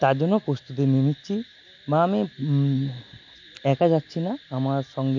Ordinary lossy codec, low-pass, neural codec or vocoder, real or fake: MP3, 48 kbps; 7.2 kHz; none; real